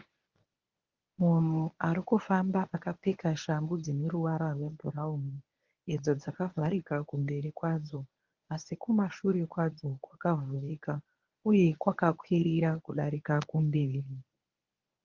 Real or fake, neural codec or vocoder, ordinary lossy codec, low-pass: fake; codec, 24 kHz, 0.9 kbps, WavTokenizer, medium speech release version 1; Opus, 32 kbps; 7.2 kHz